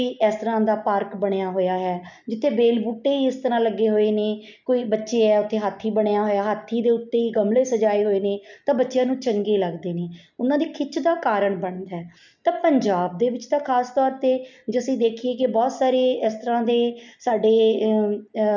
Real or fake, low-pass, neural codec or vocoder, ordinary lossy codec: real; 7.2 kHz; none; none